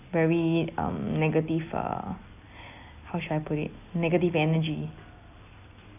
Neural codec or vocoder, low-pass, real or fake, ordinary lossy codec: none; 3.6 kHz; real; none